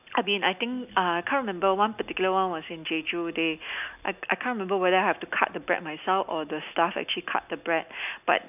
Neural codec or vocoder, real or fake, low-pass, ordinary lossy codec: none; real; 3.6 kHz; none